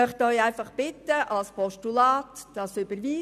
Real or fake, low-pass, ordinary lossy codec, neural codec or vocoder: real; 14.4 kHz; none; none